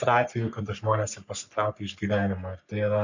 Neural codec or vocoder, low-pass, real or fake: codec, 44.1 kHz, 3.4 kbps, Pupu-Codec; 7.2 kHz; fake